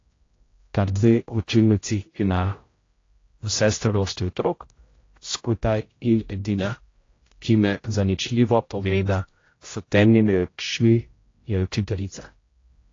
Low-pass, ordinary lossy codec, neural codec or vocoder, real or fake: 7.2 kHz; AAC, 32 kbps; codec, 16 kHz, 0.5 kbps, X-Codec, HuBERT features, trained on general audio; fake